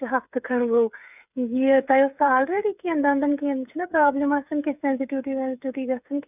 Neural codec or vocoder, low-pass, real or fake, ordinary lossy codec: codec, 16 kHz, 8 kbps, FreqCodec, smaller model; 3.6 kHz; fake; none